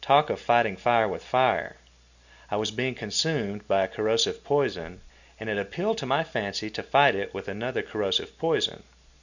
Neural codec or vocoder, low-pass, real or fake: none; 7.2 kHz; real